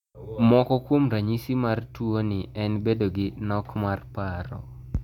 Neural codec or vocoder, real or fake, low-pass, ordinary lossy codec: none; real; 19.8 kHz; none